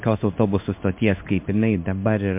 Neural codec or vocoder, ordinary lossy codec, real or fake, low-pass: codec, 16 kHz in and 24 kHz out, 1 kbps, XY-Tokenizer; MP3, 32 kbps; fake; 3.6 kHz